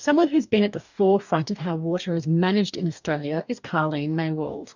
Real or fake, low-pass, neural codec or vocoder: fake; 7.2 kHz; codec, 44.1 kHz, 2.6 kbps, DAC